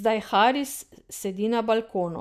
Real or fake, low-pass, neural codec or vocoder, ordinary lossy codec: real; 14.4 kHz; none; MP3, 96 kbps